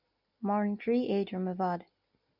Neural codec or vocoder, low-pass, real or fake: none; 5.4 kHz; real